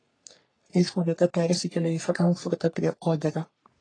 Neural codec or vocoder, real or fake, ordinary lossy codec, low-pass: codec, 44.1 kHz, 3.4 kbps, Pupu-Codec; fake; AAC, 32 kbps; 9.9 kHz